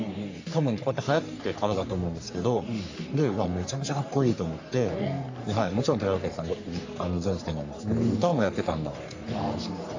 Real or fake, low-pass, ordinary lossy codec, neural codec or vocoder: fake; 7.2 kHz; AAC, 48 kbps; codec, 44.1 kHz, 3.4 kbps, Pupu-Codec